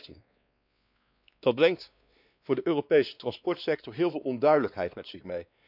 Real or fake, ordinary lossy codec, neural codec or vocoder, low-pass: fake; none; codec, 16 kHz, 2 kbps, X-Codec, WavLM features, trained on Multilingual LibriSpeech; 5.4 kHz